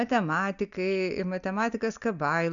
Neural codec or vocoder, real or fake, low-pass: none; real; 7.2 kHz